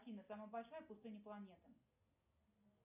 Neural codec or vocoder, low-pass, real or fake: none; 3.6 kHz; real